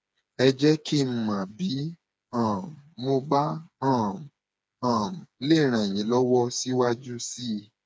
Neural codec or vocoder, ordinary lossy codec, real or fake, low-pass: codec, 16 kHz, 4 kbps, FreqCodec, smaller model; none; fake; none